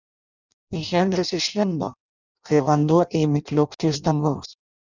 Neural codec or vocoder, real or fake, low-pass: codec, 16 kHz in and 24 kHz out, 0.6 kbps, FireRedTTS-2 codec; fake; 7.2 kHz